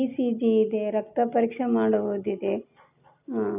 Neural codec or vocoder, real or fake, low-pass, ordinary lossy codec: none; real; 3.6 kHz; none